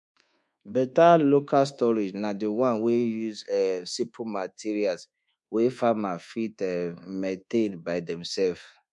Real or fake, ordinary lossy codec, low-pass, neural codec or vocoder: fake; MP3, 64 kbps; 10.8 kHz; codec, 24 kHz, 1.2 kbps, DualCodec